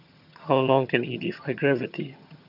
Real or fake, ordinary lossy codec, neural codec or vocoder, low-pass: fake; none; vocoder, 22.05 kHz, 80 mel bands, HiFi-GAN; 5.4 kHz